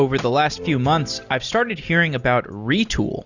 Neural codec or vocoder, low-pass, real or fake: none; 7.2 kHz; real